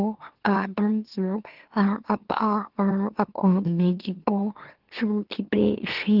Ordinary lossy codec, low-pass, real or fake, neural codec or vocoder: Opus, 16 kbps; 5.4 kHz; fake; autoencoder, 44.1 kHz, a latent of 192 numbers a frame, MeloTTS